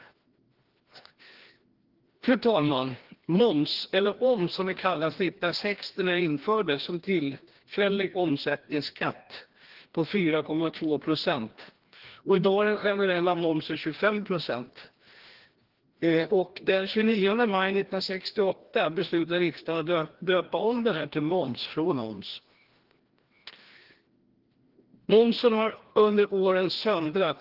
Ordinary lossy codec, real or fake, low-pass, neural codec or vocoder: Opus, 16 kbps; fake; 5.4 kHz; codec, 16 kHz, 1 kbps, FreqCodec, larger model